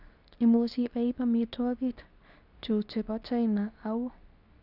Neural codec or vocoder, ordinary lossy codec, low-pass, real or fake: codec, 24 kHz, 0.9 kbps, WavTokenizer, medium speech release version 2; none; 5.4 kHz; fake